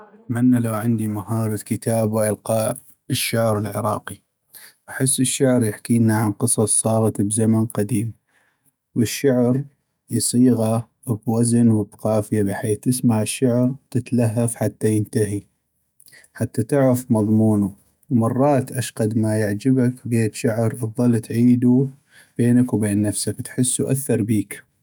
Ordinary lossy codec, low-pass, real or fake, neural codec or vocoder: none; none; fake; autoencoder, 48 kHz, 128 numbers a frame, DAC-VAE, trained on Japanese speech